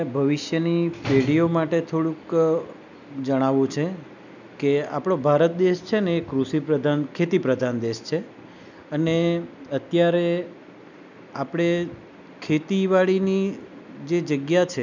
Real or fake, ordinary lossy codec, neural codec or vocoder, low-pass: real; none; none; 7.2 kHz